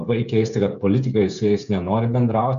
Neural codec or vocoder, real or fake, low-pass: codec, 16 kHz, 8 kbps, FreqCodec, smaller model; fake; 7.2 kHz